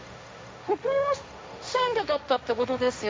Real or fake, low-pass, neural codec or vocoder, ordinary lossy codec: fake; none; codec, 16 kHz, 1.1 kbps, Voila-Tokenizer; none